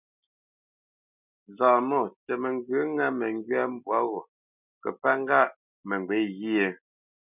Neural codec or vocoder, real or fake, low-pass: none; real; 3.6 kHz